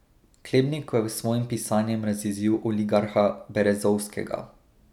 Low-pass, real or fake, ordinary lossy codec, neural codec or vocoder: 19.8 kHz; real; none; none